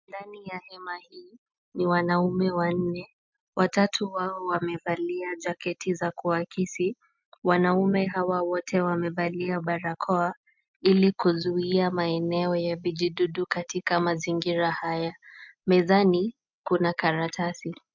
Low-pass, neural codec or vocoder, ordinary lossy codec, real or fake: 7.2 kHz; none; MP3, 64 kbps; real